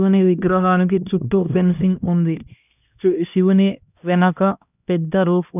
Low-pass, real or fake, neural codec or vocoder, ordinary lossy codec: 3.6 kHz; fake; codec, 16 kHz, 1 kbps, X-Codec, HuBERT features, trained on LibriSpeech; none